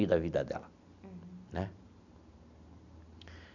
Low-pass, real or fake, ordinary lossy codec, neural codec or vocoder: 7.2 kHz; real; none; none